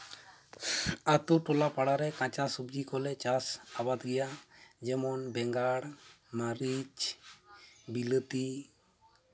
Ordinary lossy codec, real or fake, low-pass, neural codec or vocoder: none; real; none; none